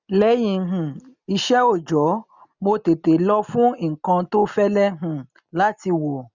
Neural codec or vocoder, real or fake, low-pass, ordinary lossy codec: none; real; 7.2 kHz; none